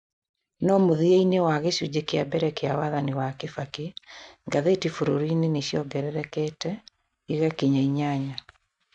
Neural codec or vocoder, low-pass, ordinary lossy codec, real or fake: none; 10.8 kHz; none; real